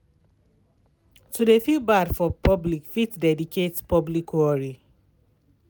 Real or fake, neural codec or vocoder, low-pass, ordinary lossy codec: real; none; none; none